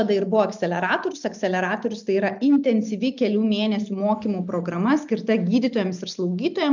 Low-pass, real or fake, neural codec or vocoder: 7.2 kHz; real; none